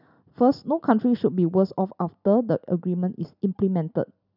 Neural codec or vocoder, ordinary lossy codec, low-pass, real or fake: none; none; 5.4 kHz; real